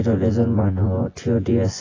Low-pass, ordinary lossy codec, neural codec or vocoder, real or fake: 7.2 kHz; AAC, 32 kbps; vocoder, 24 kHz, 100 mel bands, Vocos; fake